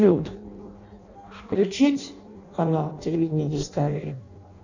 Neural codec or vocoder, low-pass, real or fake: codec, 16 kHz in and 24 kHz out, 0.6 kbps, FireRedTTS-2 codec; 7.2 kHz; fake